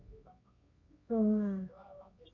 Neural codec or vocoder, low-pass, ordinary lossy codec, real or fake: codec, 24 kHz, 0.9 kbps, WavTokenizer, medium music audio release; 7.2 kHz; none; fake